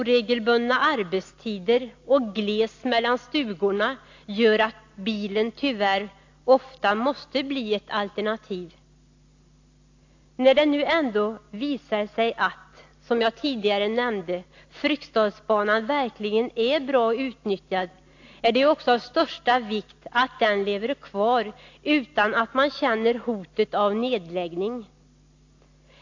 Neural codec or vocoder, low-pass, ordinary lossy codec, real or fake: none; 7.2 kHz; AAC, 48 kbps; real